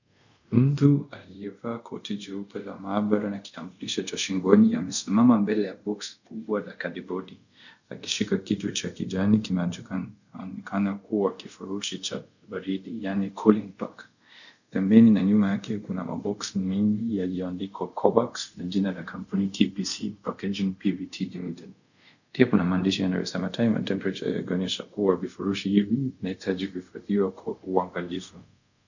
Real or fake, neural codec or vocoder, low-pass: fake; codec, 24 kHz, 0.5 kbps, DualCodec; 7.2 kHz